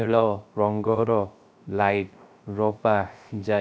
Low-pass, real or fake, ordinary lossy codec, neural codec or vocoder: none; fake; none; codec, 16 kHz, 0.3 kbps, FocalCodec